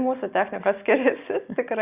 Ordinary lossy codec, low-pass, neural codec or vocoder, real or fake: Opus, 64 kbps; 3.6 kHz; none; real